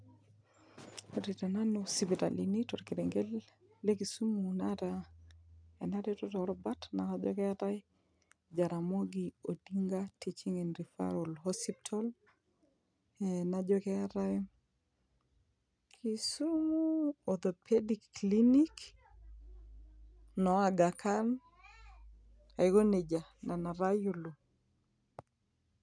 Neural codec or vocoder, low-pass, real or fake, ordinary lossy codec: none; 9.9 kHz; real; none